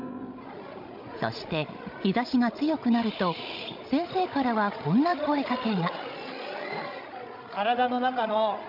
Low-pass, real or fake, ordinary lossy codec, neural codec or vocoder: 5.4 kHz; fake; MP3, 48 kbps; codec, 16 kHz, 16 kbps, FreqCodec, larger model